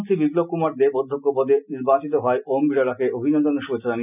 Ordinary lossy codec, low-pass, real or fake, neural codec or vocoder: none; 3.6 kHz; real; none